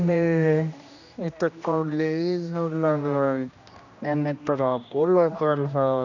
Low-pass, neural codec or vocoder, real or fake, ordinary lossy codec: 7.2 kHz; codec, 16 kHz, 1 kbps, X-Codec, HuBERT features, trained on general audio; fake; none